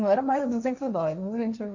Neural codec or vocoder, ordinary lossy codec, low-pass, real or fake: codec, 16 kHz, 1.1 kbps, Voila-Tokenizer; none; 7.2 kHz; fake